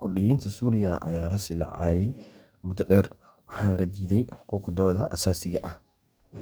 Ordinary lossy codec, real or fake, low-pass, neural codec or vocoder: none; fake; none; codec, 44.1 kHz, 2.6 kbps, DAC